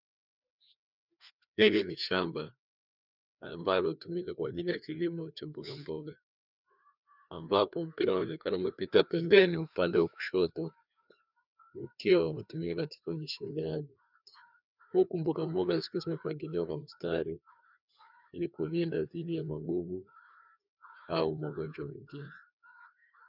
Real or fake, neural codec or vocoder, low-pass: fake; codec, 16 kHz, 2 kbps, FreqCodec, larger model; 5.4 kHz